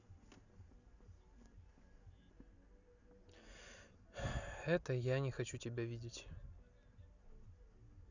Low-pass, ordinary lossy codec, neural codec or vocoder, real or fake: 7.2 kHz; none; none; real